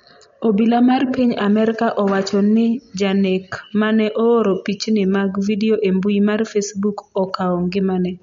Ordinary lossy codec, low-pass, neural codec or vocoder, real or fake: MP3, 48 kbps; 7.2 kHz; none; real